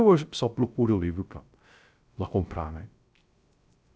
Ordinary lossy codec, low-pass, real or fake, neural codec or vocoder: none; none; fake; codec, 16 kHz, 0.3 kbps, FocalCodec